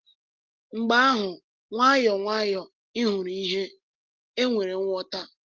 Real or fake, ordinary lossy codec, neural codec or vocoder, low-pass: real; Opus, 16 kbps; none; 7.2 kHz